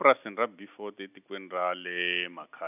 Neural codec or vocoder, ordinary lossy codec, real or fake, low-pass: none; none; real; 3.6 kHz